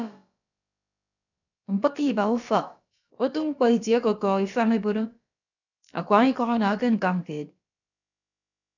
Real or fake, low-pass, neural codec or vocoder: fake; 7.2 kHz; codec, 16 kHz, about 1 kbps, DyCAST, with the encoder's durations